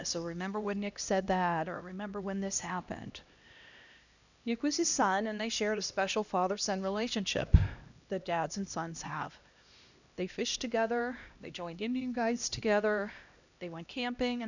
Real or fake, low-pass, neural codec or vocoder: fake; 7.2 kHz; codec, 16 kHz, 1 kbps, X-Codec, HuBERT features, trained on LibriSpeech